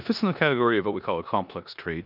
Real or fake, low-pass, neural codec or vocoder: fake; 5.4 kHz; codec, 16 kHz in and 24 kHz out, 0.9 kbps, LongCat-Audio-Codec, four codebook decoder